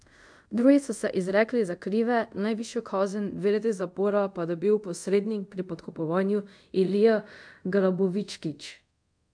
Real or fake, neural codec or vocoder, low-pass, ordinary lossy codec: fake; codec, 24 kHz, 0.5 kbps, DualCodec; 9.9 kHz; MP3, 64 kbps